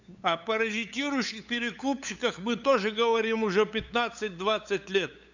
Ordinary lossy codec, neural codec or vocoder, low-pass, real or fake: none; codec, 16 kHz, 8 kbps, FunCodec, trained on LibriTTS, 25 frames a second; 7.2 kHz; fake